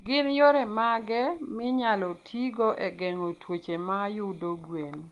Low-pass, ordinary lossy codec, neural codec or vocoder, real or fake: 10.8 kHz; Opus, 24 kbps; none; real